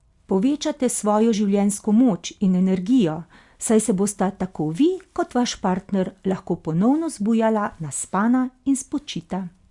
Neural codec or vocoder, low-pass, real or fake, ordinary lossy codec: none; 10.8 kHz; real; Opus, 64 kbps